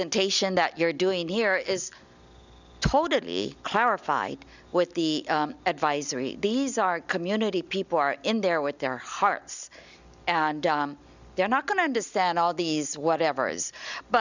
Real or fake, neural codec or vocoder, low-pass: real; none; 7.2 kHz